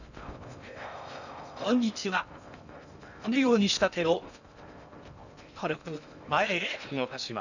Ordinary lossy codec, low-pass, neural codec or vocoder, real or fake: none; 7.2 kHz; codec, 16 kHz in and 24 kHz out, 0.6 kbps, FocalCodec, streaming, 4096 codes; fake